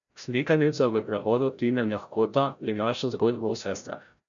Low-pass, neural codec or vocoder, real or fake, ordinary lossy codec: 7.2 kHz; codec, 16 kHz, 0.5 kbps, FreqCodec, larger model; fake; none